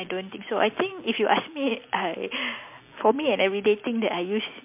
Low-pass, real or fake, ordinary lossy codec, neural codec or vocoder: 3.6 kHz; real; MP3, 32 kbps; none